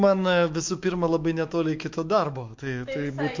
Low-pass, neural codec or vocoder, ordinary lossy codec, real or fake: 7.2 kHz; autoencoder, 48 kHz, 128 numbers a frame, DAC-VAE, trained on Japanese speech; MP3, 48 kbps; fake